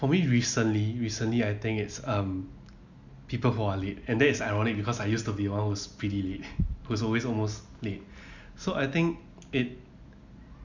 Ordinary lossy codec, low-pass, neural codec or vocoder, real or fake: none; 7.2 kHz; none; real